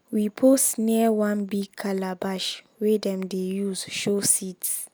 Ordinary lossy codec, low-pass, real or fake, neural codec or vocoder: none; none; real; none